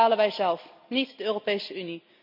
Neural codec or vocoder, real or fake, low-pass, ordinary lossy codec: none; real; 5.4 kHz; none